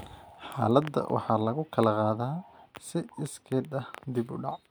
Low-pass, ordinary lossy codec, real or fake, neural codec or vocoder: none; none; real; none